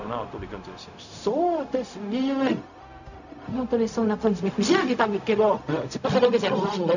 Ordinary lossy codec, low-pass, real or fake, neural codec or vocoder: none; 7.2 kHz; fake; codec, 16 kHz, 0.4 kbps, LongCat-Audio-Codec